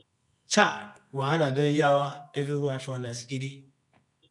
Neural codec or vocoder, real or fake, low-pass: codec, 24 kHz, 0.9 kbps, WavTokenizer, medium music audio release; fake; 10.8 kHz